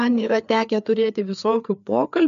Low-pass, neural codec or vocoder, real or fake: 7.2 kHz; codec, 16 kHz, 4 kbps, FreqCodec, larger model; fake